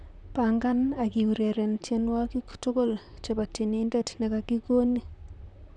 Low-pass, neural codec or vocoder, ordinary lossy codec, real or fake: 10.8 kHz; vocoder, 44.1 kHz, 128 mel bands, Pupu-Vocoder; none; fake